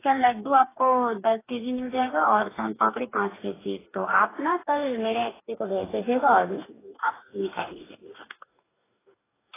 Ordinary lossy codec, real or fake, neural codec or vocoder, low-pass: AAC, 16 kbps; fake; codec, 44.1 kHz, 2.6 kbps, DAC; 3.6 kHz